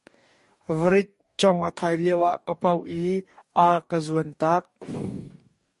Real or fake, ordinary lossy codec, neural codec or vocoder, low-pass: fake; MP3, 48 kbps; codec, 44.1 kHz, 2.6 kbps, DAC; 14.4 kHz